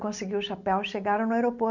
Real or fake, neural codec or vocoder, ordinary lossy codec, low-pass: real; none; none; 7.2 kHz